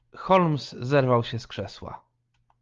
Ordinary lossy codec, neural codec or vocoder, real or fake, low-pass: Opus, 32 kbps; none; real; 7.2 kHz